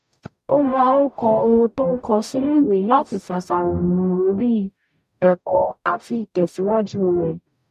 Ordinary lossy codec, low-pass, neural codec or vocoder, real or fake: none; 14.4 kHz; codec, 44.1 kHz, 0.9 kbps, DAC; fake